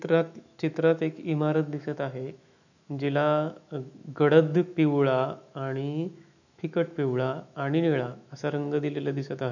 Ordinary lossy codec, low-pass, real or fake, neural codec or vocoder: none; 7.2 kHz; fake; autoencoder, 48 kHz, 128 numbers a frame, DAC-VAE, trained on Japanese speech